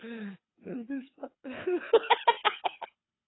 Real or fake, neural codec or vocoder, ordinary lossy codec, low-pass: fake; codec, 24 kHz, 6 kbps, HILCodec; AAC, 16 kbps; 7.2 kHz